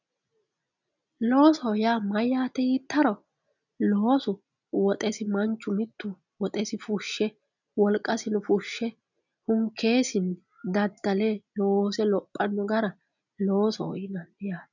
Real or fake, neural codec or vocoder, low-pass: real; none; 7.2 kHz